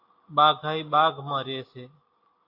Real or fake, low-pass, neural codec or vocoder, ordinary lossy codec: real; 5.4 kHz; none; AAC, 32 kbps